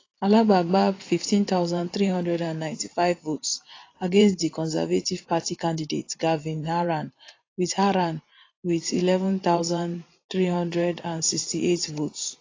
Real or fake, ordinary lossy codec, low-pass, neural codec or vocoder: fake; AAC, 32 kbps; 7.2 kHz; vocoder, 44.1 kHz, 128 mel bands every 512 samples, BigVGAN v2